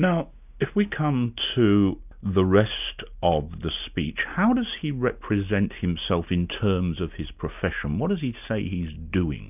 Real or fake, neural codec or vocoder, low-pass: real; none; 3.6 kHz